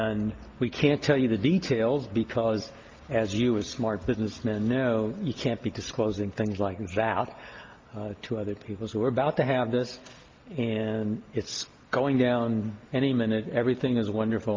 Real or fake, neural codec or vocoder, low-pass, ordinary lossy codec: real; none; 7.2 kHz; Opus, 32 kbps